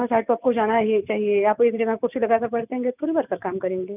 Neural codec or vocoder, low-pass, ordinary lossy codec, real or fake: none; 3.6 kHz; none; real